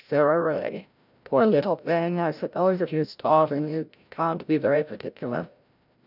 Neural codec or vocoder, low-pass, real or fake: codec, 16 kHz, 0.5 kbps, FreqCodec, larger model; 5.4 kHz; fake